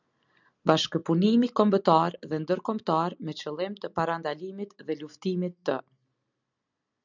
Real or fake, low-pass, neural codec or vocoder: real; 7.2 kHz; none